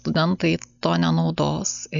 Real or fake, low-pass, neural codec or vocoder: real; 7.2 kHz; none